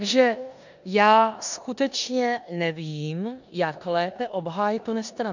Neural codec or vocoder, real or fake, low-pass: codec, 16 kHz in and 24 kHz out, 0.9 kbps, LongCat-Audio-Codec, four codebook decoder; fake; 7.2 kHz